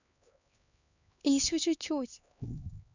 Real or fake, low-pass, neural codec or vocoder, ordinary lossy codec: fake; 7.2 kHz; codec, 16 kHz, 2 kbps, X-Codec, HuBERT features, trained on LibriSpeech; none